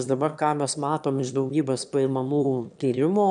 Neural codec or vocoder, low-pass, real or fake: autoencoder, 22.05 kHz, a latent of 192 numbers a frame, VITS, trained on one speaker; 9.9 kHz; fake